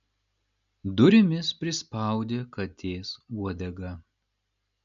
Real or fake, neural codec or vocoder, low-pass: real; none; 7.2 kHz